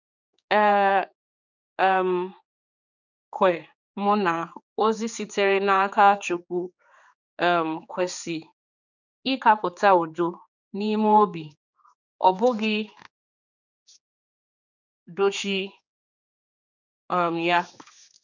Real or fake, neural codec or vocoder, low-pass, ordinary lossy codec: fake; codec, 16 kHz, 4 kbps, X-Codec, HuBERT features, trained on general audio; 7.2 kHz; none